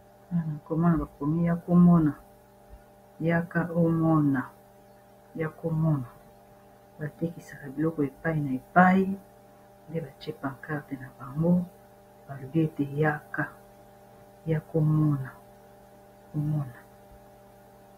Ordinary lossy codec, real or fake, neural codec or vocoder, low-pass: AAC, 48 kbps; real; none; 19.8 kHz